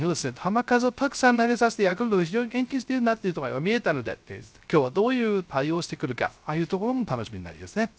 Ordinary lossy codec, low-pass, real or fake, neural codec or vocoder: none; none; fake; codec, 16 kHz, 0.3 kbps, FocalCodec